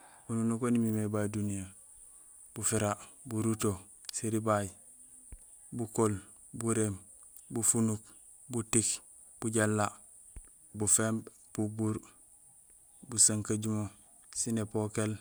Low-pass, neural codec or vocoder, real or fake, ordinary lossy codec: none; none; real; none